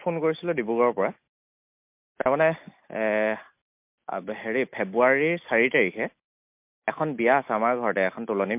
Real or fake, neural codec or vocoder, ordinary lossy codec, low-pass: real; none; MP3, 32 kbps; 3.6 kHz